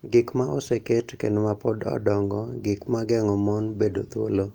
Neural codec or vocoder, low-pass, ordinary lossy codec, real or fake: none; 19.8 kHz; Opus, 32 kbps; real